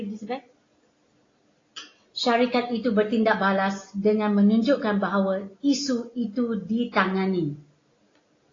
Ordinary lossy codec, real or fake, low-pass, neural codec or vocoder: AAC, 32 kbps; real; 7.2 kHz; none